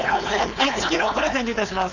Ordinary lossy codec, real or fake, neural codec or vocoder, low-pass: none; fake; codec, 16 kHz, 4.8 kbps, FACodec; 7.2 kHz